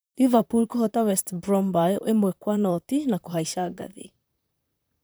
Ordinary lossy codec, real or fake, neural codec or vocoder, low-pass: none; fake; vocoder, 44.1 kHz, 128 mel bands, Pupu-Vocoder; none